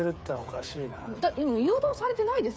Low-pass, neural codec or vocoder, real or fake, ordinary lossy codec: none; codec, 16 kHz, 8 kbps, FreqCodec, smaller model; fake; none